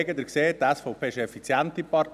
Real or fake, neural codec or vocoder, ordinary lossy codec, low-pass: real; none; none; 14.4 kHz